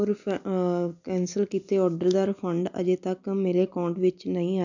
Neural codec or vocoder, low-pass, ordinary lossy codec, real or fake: none; 7.2 kHz; none; real